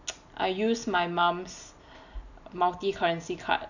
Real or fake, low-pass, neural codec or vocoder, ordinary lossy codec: real; 7.2 kHz; none; none